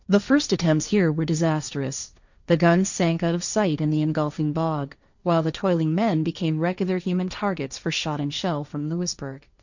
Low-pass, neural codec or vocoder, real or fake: 7.2 kHz; codec, 16 kHz, 1.1 kbps, Voila-Tokenizer; fake